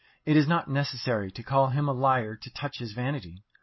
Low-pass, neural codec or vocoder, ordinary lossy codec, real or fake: 7.2 kHz; none; MP3, 24 kbps; real